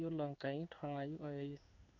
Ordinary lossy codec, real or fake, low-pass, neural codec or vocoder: none; fake; 7.2 kHz; codec, 16 kHz in and 24 kHz out, 1 kbps, XY-Tokenizer